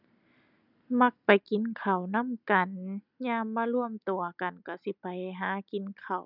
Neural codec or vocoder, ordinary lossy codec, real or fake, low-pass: none; none; real; 5.4 kHz